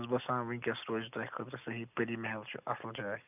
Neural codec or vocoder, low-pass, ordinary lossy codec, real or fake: none; 3.6 kHz; none; real